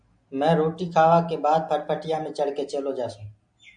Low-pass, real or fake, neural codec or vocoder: 9.9 kHz; real; none